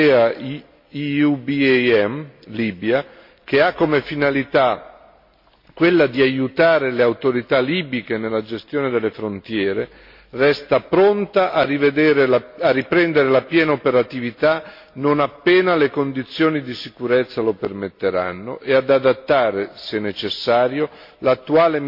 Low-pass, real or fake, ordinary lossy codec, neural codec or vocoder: 5.4 kHz; real; MP3, 32 kbps; none